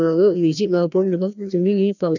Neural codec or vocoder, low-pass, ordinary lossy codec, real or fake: codec, 16 kHz, 1 kbps, FreqCodec, larger model; 7.2 kHz; none; fake